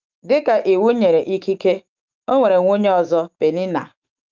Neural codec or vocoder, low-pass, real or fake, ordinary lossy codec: autoencoder, 48 kHz, 128 numbers a frame, DAC-VAE, trained on Japanese speech; 7.2 kHz; fake; Opus, 24 kbps